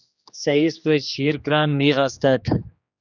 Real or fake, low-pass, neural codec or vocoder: fake; 7.2 kHz; codec, 16 kHz, 2 kbps, X-Codec, HuBERT features, trained on general audio